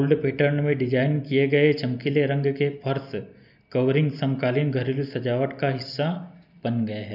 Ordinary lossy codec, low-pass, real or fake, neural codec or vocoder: none; 5.4 kHz; real; none